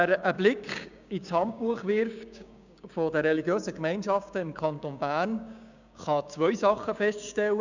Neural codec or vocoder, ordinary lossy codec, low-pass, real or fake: codec, 16 kHz, 6 kbps, DAC; none; 7.2 kHz; fake